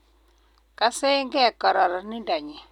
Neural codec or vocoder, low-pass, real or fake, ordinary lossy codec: none; 19.8 kHz; real; none